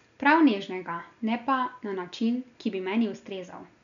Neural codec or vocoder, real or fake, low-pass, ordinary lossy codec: none; real; 7.2 kHz; MP3, 96 kbps